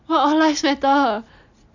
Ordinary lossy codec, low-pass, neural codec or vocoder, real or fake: none; 7.2 kHz; none; real